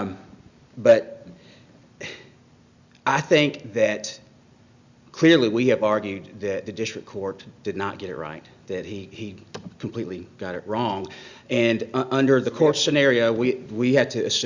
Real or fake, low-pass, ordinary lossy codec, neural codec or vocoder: real; 7.2 kHz; Opus, 64 kbps; none